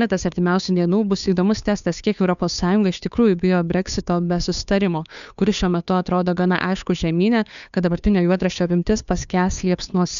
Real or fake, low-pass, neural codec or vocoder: fake; 7.2 kHz; codec, 16 kHz, 2 kbps, FunCodec, trained on Chinese and English, 25 frames a second